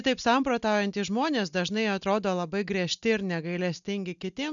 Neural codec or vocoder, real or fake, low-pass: none; real; 7.2 kHz